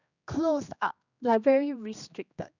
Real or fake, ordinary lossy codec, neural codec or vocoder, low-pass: fake; none; codec, 16 kHz, 2 kbps, X-Codec, HuBERT features, trained on general audio; 7.2 kHz